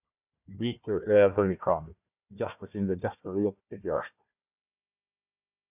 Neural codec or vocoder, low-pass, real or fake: codec, 16 kHz, 1 kbps, FunCodec, trained on Chinese and English, 50 frames a second; 3.6 kHz; fake